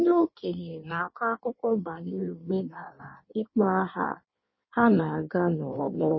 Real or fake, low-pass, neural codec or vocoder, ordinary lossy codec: fake; 7.2 kHz; codec, 16 kHz in and 24 kHz out, 1.1 kbps, FireRedTTS-2 codec; MP3, 24 kbps